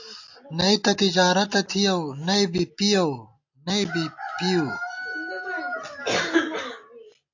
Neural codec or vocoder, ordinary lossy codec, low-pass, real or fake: none; AAC, 48 kbps; 7.2 kHz; real